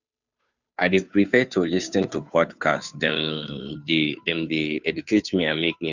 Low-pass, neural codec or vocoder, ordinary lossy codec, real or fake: 7.2 kHz; codec, 16 kHz, 2 kbps, FunCodec, trained on Chinese and English, 25 frames a second; none; fake